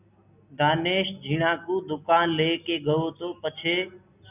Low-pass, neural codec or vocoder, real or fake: 3.6 kHz; none; real